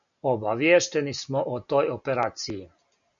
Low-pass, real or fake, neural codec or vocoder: 7.2 kHz; real; none